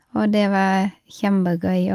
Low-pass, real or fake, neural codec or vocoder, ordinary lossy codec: 14.4 kHz; real; none; Opus, 32 kbps